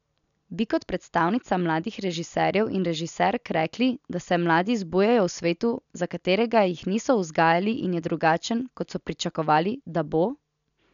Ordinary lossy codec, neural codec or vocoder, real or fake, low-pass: none; none; real; 7.2 kHz